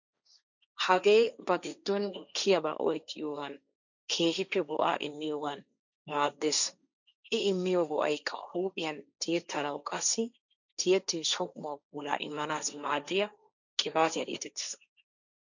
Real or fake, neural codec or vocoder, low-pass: fake; codec, 16 kHz, 1.1 kbps, Voila-Tokenizer; 7.2 kHz